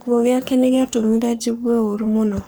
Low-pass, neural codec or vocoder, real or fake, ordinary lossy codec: none; codec, 44.1 kHz, 3.4 kbps, Pupu-Codec; fake; none